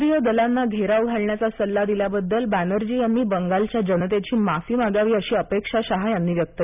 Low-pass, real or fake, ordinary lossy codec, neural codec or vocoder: 3.6 kHz; real; none; none